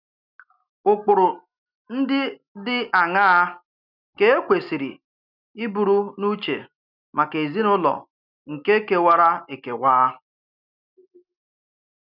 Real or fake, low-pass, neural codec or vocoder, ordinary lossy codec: real; 5.4 kHz; none; none